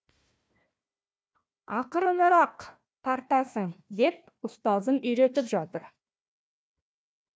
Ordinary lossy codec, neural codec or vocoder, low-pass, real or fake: none; codec, 16 kHz, 1 kbps, FunCodec, trained on Chinese and English, 50 frames a second; none; fake